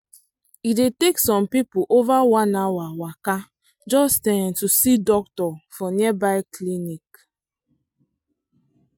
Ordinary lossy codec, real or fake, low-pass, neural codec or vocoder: MP3, 96 kbps; real; 19.8 kHz; none